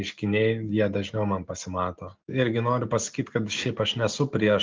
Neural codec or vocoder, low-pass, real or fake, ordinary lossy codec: none; 7.2 kHz; real; Opus, 32 kbps